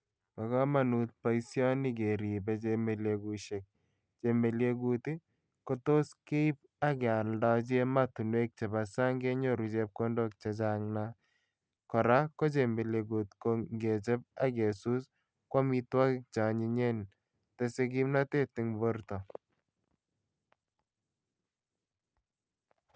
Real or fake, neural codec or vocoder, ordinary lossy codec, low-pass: real; none; none; none